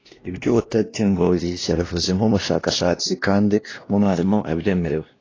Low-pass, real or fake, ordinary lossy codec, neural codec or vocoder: 7.2 kHz; fake; AAC, 32 kbps; codec, 16 kHz, 1 kbps, X-Codec, WavLM features, trained on Multilingual LibriSpeech